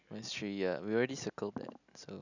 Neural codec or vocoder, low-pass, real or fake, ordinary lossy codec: none; 7.2 kHz; real; none